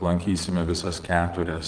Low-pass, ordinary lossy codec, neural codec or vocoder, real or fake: 9.9 kHz; Opus, 24 kbps; vocoder, 22.05 kHz, 80 mel bands, Vocos; fake